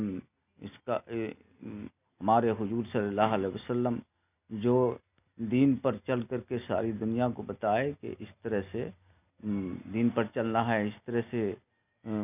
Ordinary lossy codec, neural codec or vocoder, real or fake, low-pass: none; none; real; 3.6 kHz